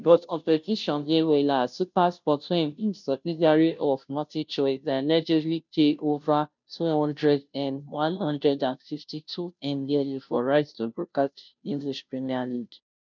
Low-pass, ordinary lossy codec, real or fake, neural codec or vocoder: 7.2 kHz; none; fake; codec, 16 kHz, 0.5 kbps, FunCodec, trained on Chinese and English, 25 frames a second